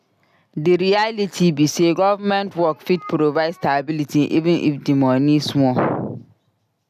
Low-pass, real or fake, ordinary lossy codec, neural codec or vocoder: 14.4 kHz; real; none; none